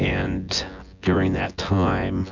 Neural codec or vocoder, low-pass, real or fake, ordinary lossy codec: vocoder, 24 kHz, 100 mel bands, Vocos; 7.2 kHz; fake; MP3, 64 kbps